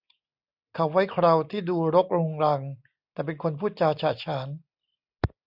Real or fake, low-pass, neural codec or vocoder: real; 5.4 kHz; none